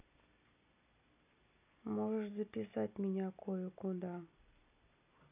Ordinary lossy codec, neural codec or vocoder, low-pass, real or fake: none; none; 3.6 kHz; real